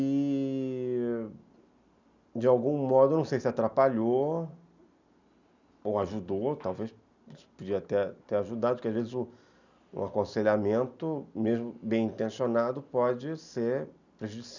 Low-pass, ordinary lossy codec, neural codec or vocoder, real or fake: 7.2 kHz; none; none; real